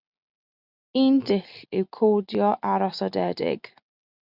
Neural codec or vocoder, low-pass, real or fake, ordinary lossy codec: none; 5.4 kHz; real; Opus, 64 kbps